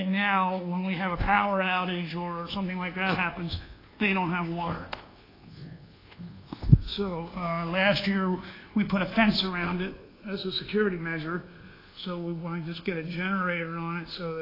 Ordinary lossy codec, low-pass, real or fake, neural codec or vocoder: AAC, 24 kbps; 5.4 kHz; fake; codec, 24 kHz, 1.2 kbps, DualCodec